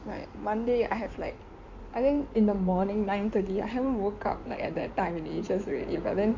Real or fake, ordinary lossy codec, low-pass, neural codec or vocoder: fake; MP3, 48 kbps; 7.2 kHz; codec, 16 kHz in and 24 kHz out, 2.2 kbps, FireRedTTS-2 codec